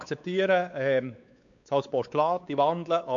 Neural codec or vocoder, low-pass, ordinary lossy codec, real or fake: codec, 16 kHz, 4 kbps, X-Codec, WavLM features, trained on Multilingual LibriSpeech; 7.2 kHz; none; fake